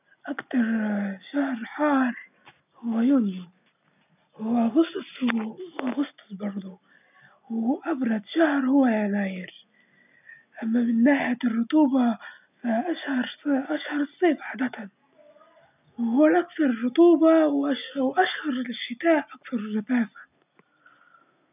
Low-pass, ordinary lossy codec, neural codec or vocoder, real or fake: 3.6 kHz; none; none; real